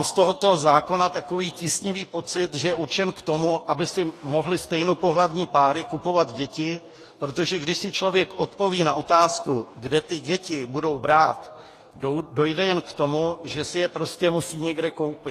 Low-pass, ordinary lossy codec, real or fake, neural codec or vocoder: 14.4 kHz; AAC, 48 kbps; fake; codec, 44.1 kHz, 2.6 kbps, DAC